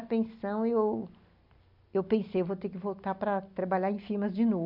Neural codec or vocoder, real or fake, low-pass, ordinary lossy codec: none; real; 5.4 kHz; none